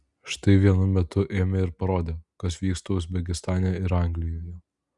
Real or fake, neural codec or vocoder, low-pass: real; none; 10.8 kHz